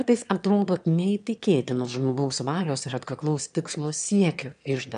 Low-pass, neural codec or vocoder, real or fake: 9.9 kHz; autoencoder, 22.05 kHz, a latent of 192 numbers a frame, VITS, trained on one speaker; fake